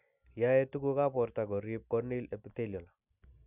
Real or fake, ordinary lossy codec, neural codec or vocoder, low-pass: real; none; none; 3.6 kHz